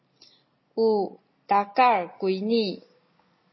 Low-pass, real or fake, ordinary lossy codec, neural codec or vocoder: 7.2 kHz; real; MP3, 24 kbps; none